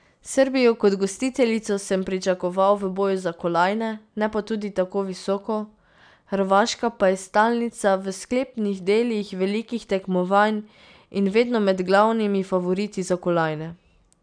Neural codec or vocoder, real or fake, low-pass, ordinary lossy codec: none; real; 9.9 kHz; none